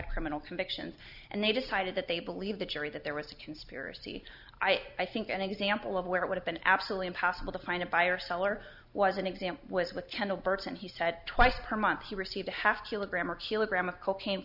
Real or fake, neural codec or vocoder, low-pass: real; none; 5.4 kHz